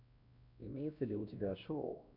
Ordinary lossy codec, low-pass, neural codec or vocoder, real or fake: MP3, 48 kbps; 5.4 kHz; codec, 16 kHz, 1 kbps, X-Codec, WavLM features, trained on Multilingual LibriSpeech; fake